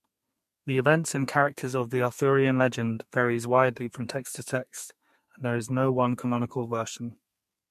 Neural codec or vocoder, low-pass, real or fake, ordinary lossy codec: codec, 32 kHz, 1.9 kbps, SNAC; 14.4 kHz; fake; MP3, 64 kbps